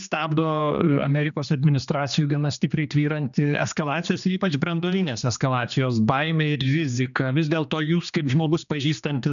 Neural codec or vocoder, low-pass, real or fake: codec, 16 kHz, 2 kbps, X-Codec, HuBERT features, trained on general audio; 7.2 kHz; fake